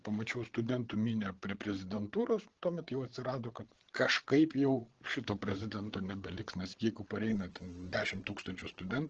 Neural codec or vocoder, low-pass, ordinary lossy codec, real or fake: codec, 16 kHz, 8 kbps, FreqCodec, larger model; 7.2 kHz; Opus, 16 kbps; fake